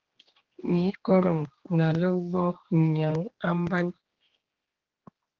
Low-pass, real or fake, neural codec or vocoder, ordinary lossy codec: 7.2 kHz; fake; codec, 16 kHz, 2 kbps, X-Codec, HuBERT features, trained on general audio; Opus, 16 kbps